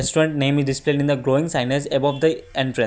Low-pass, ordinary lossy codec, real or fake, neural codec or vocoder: none; none; real; none